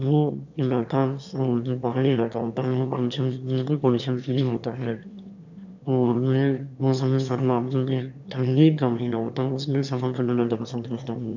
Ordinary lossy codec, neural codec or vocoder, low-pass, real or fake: none; autoencoder, 22.05 kHz, a latent of 192 numbers a frame, VITS, trained on one speaker; 7.2 kHz; fake